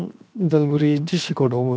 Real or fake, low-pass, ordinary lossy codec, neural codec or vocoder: fake; none; none; codec, 16 kHz, 0.7 kbps, FocalCodec